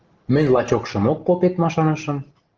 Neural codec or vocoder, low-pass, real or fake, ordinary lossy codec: none; 7.2 kHz; real; Opus, 16 kbps